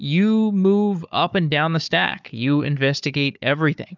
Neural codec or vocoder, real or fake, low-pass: codec, 16 kHz, 4 kbps, FunCodec, trained on Chinese and English, 50 frames a second; fake; 7.2 kHz